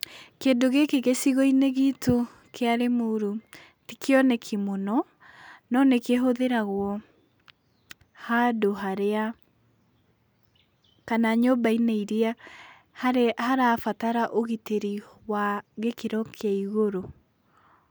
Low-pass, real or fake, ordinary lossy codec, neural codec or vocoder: none; real; none; none